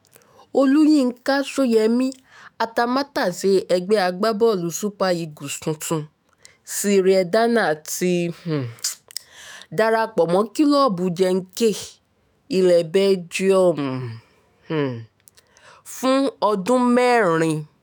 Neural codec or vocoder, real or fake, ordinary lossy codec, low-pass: autoencoder, 48 kHz, 128 numbers a frame, DAC-VAE, trained on Japanese speech; fake; none; none